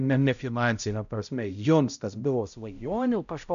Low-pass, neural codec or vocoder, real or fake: 7.2 kHz; codec, 16 kHz, 0.5 kbps, X-Codec, HuBERT features, trained on balanced general audio; fake